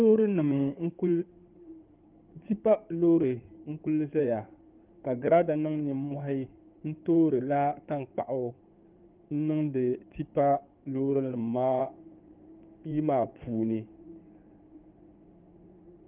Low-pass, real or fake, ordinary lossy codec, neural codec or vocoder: 3.6 kHz; fake; Opus, 32 kbps; codec, 16 kHz in and 24 kHz out, 2.2 kbps, FireRedTTS-2 codec